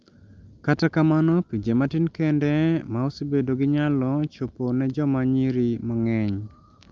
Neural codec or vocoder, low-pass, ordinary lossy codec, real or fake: none; 7.2 kHz; Opus, 24 kbps; real